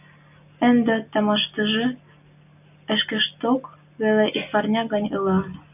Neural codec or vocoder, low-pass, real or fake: none; 3.6 kHz; real